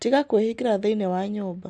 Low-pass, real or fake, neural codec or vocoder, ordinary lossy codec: 9.9 kHz; real; none; none